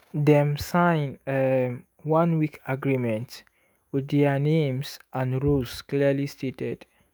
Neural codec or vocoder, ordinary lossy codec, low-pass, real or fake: autoencoder, 48 kHz, 128 numbers a frame, DAC-VAE, trained on Japanese speech; none; none; fake